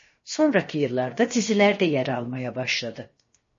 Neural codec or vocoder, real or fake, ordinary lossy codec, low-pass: codec, 16 kHz, 0.7 kbps, FocalCodec; fake; MP3, 32 kbps; 7.2 kHz